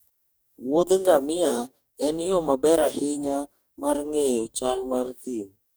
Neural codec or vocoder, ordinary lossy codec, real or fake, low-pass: codec, 44.1 kHz, 2.6 kbps, DAC; none; fake; none